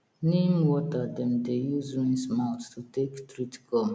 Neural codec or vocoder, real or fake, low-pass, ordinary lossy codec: none; real; none; none